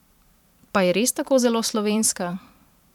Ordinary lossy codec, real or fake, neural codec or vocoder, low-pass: none; fake; vocoder, 44.1 kHz, 128 mel bands every 512 samples, BigVGAN v2; 19.8 kHz